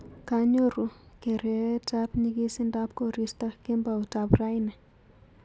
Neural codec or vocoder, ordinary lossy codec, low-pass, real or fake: none; none; none; real